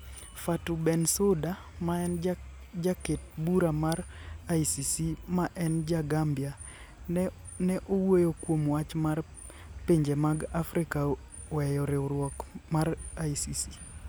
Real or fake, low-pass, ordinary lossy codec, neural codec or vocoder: real; none; none; none